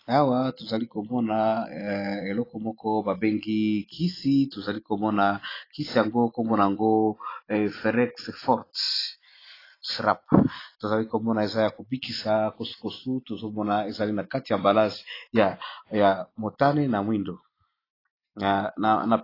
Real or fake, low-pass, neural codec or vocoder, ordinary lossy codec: real; 5.4 kHz; none; AAC, 24 kbps